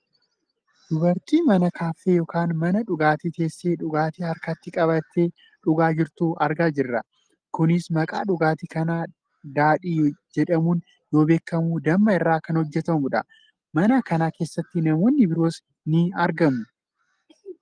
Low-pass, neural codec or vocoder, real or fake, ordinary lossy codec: 9.9 kHz; none; real; Opus, 24 kbps